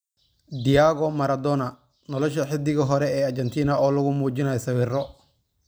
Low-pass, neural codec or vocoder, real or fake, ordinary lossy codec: none; none; real; none